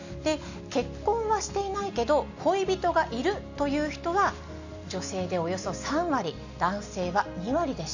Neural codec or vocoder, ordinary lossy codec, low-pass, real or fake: none; none; 7.2 kHz; real